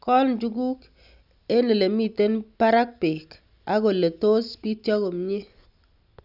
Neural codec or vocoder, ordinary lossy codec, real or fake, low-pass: none; none; real; 5.4 kHz